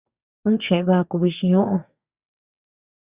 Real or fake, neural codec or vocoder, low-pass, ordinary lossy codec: fake; codec, 32 kHz, 1.9 kbps, SNAC; 3.6 kHz; Opus, 64 kbps